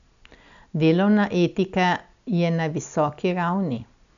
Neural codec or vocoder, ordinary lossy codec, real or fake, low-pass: none; none; real; 7.2 kHz